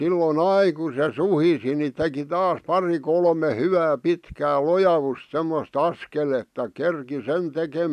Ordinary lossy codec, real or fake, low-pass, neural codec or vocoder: none; real; 14.4 kHz; none